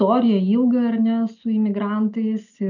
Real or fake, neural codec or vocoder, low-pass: real; none; 7.2 kHz